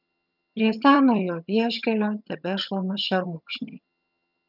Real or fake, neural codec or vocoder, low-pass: fake; vocoder, 22.05 kHz, 80 mel bands, HiFi-GAN; 5.4 kHz